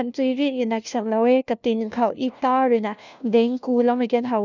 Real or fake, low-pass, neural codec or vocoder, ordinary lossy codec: fake; 7.2 kHz; codec, 16 kHz, 1 kbps, FunCodec, trained on LibriTTS, 50 frames a second; none